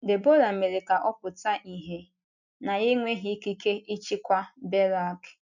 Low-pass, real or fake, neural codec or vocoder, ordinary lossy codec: 7.2 kHz; real; none; none